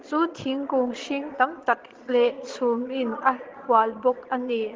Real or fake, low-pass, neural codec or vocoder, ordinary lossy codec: fake; 7.2 kHz; vocoder, 22.05 kHz, 80 mel bands, Vocos; Opus, 16 kbps